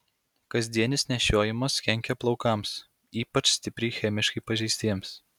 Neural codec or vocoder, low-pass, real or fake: none; 19.8 kHz; real